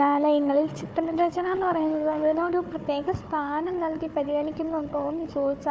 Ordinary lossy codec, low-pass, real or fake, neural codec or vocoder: none; none; fake; codec, 16 kHz, 4 kbps, FunCodec, trained on Chinese and English, 50 frames a second